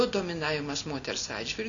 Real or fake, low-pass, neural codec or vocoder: real; 7.2 kHz; none